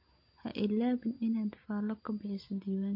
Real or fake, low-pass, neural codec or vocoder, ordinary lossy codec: real; 5.4 kHz; none; MP3, 32 kbps